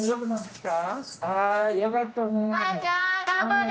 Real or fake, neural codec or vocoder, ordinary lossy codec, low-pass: fake; codec, 16 kHz, 1 kbps, X-Codec, HuBERT features, trained on general audio; none; none